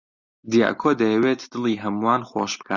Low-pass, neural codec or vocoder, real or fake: 7.2 kHz; none; real